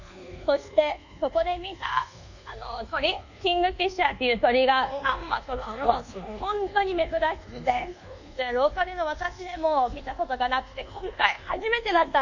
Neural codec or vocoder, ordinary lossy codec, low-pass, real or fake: codec, 24 kHz, 1.2 kbps, DualCodec; none; 7.2 kHz; fake